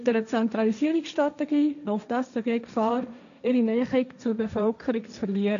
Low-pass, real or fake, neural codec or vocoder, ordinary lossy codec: 7.2 kHz; fake; codec, 16 kHz, 1.1 kbps, Voila-Tokenizer; none